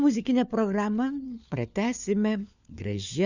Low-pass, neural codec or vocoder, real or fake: 7.2 kHz; codec, 16 kHz, 4 kbps, FunCodec, trained on LibriTTS, 50 frames a second; fake